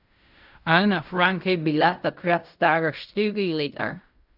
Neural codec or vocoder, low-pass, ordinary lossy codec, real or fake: codec, 16 kHz in and 24 kHz out, 0.4 kbps, LongCat-Audio-Codec, fine tuned four codebook decoder; 5.4 kHz; none; fake